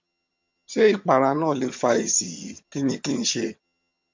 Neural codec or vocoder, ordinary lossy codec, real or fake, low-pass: vocoder, 22.05 kHz, 80 mel bands, HiFi-GAN; MP3, 48 kbps; fake; 7.2 kHz